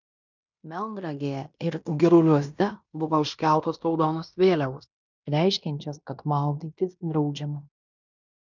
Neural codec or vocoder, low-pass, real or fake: codec, 16 kHz in and 24 kHz out, 0.9 kbps, LongCat-Audio-Codec, fine tuned four codebook decoder; 7.2 kHz; fake